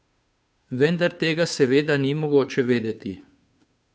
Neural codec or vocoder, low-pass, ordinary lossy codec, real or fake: codec, 16 kHz, 2 kbps, FunCodec, trained on Chinese and English, 25 frames a second; none; none; fake